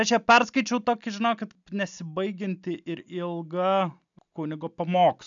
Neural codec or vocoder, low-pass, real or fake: none; 7.2 kHz; real